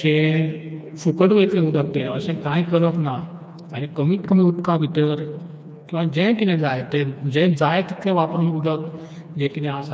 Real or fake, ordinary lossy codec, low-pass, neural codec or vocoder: fake; none; none; codec, 16 kHz, 2 kbps, FreqCodec, smaller model